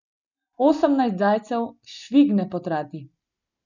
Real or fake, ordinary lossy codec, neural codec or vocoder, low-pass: real; none; none; 7.2 kHz